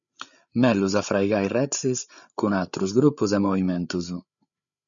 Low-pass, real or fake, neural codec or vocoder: 7.2 kHz; fake; codec, 16 kHz, 8 kbps, FreqCodec, larger model